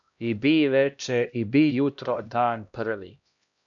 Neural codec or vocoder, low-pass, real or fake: codec, 16 kHz, 1 kbps, X-Codec, HuBERT features, trained on LibriSpeech; 7.2 kHz; fake